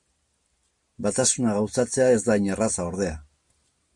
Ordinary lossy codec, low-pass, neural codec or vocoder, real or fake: MP3, 48 kbps; 10.8 kHz; none; real